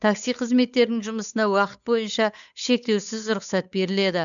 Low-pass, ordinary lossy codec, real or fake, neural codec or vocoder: 7.2 kHz; none; fake; codec, 16 kHz, 8 kbps, FunCodec, trained on Chinese and English, 25 frames a second